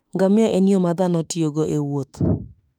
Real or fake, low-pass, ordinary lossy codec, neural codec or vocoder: fake; 19.8 kHz; none; autoencoder, 48 kHz, 32 numbers a frame, DAC-VAE, trained on Japanese speech